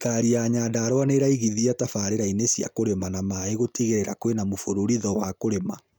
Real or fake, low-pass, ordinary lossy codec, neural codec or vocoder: real; none; none; none